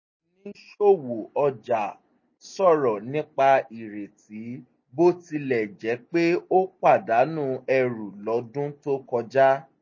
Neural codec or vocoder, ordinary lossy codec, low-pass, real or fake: none; MP3, 32 kbps; 7.2 kHz; real